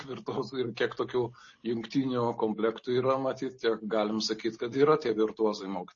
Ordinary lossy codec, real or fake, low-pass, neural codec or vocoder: MP3, 32 kbps; real; 7.2 kHz; none